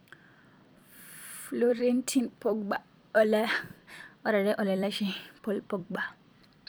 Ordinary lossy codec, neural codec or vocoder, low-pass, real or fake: none; vocoder, 44.1 kHz, 128 mel bands every 256 samples, BigVGAN v2; none; fake